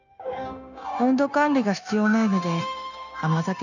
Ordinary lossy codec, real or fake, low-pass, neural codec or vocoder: none; fake; 7.2 kHz; codec, 16 kHz, 0.9 kbps, LongCat-Audio-Codec